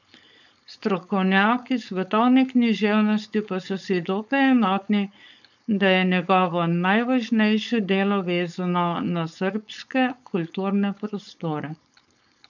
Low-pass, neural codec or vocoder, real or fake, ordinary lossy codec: 7.2 kHz; codec, 16 kHz, 4.8 kbps, FACodec; fake; none